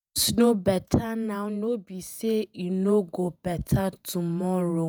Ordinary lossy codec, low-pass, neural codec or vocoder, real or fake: none; none; vocoder, 48 kHz, 128 mel bands, Vocos; fake